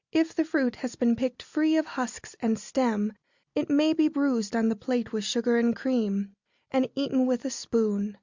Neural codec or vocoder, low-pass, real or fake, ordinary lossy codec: none; 7.2 kHz; real; Opus, 64 kbps